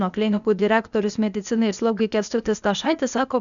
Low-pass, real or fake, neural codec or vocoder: 7.2 kHz; fake; codec, 16 kHz, 0.8 kbps, ZipCodec